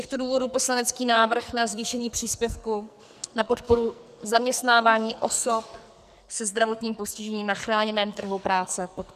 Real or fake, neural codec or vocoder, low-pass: fake; codec, 44.1 kHz, 2.6 kbps, SNAC; 14.4 kHz